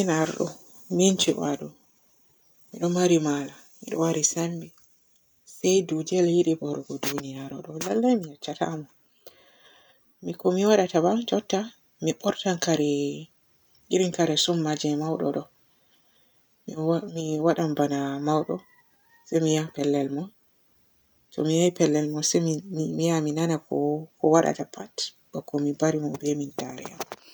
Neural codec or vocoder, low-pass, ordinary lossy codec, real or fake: none; none; none; real